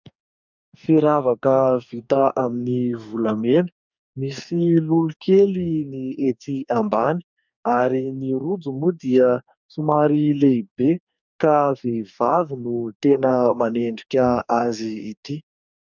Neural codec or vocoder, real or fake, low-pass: codec, 44.1 kHz, 2.6 kbps, DAC; fake; 7.2 kHz